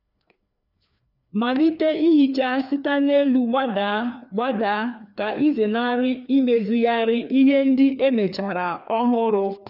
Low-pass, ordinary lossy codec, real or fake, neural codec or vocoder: 5.4 kHz; none; fake; codec, 16 kHz, 2 kbps, FreqCodec, larger model